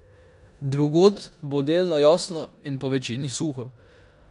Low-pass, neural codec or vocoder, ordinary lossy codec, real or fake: 10.8 kHz; codec, 16 kHz in and 24 kHz out, 0.9 kbps, LongCat-Audio-Codec, four codebook decoder; none; fake